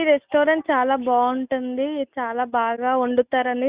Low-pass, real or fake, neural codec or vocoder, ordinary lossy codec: 3.6 kHz; real; none; Opus, 64 kbps